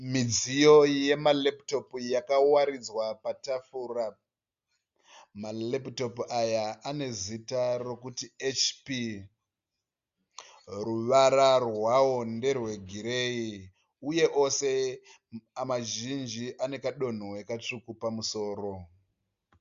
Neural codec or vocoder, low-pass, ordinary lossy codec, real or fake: none; 7.2 kHz; Opus, 64 kbps; real